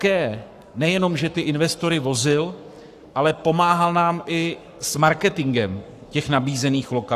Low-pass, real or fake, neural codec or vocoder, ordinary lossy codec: 14.4 kHz; fake; codec, 44.1 kHz, 7.8 kbps, Pupu-Codec; AAC, 96 kbps